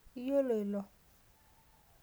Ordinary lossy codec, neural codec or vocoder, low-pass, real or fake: none; none; none; real